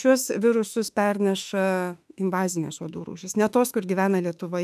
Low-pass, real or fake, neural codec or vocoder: 14.4 kHz; fake; autoencoder, 48 kHz, 32 numbers a frame, DAC-VAE, trained on Japanese speech